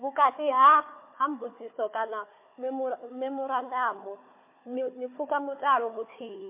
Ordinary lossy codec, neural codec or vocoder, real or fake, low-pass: MP3, 24 kbps; codec, 16 kHz, 4 kbps, FunCodec, trained on Chinese and English, 50 frames a second; fake; 3.6 kHz